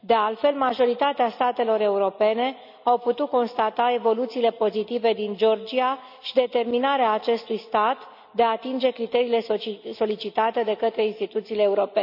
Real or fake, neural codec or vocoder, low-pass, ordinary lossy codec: real; none; 5.4 kHz; none